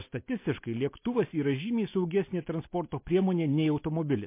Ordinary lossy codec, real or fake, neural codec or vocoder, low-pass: MP3, 24 kbps; real; none; 3.6 kHz